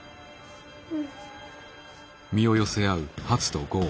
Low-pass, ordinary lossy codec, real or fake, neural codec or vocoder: none; none; real; none